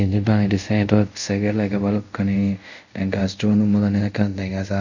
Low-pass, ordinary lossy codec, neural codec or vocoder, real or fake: 7.2 kHz; none; codec, 24 kHz, 0.5 kbps, DualCodec; fake